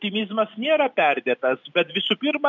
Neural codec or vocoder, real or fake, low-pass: none; real; 7.2 kHz